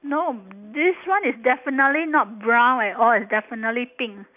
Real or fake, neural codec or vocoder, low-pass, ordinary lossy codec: real; none; 3.6 kHz; none